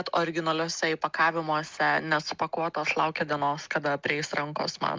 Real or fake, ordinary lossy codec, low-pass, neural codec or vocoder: real; Opus, 32 kbps; 7.2 kHz; none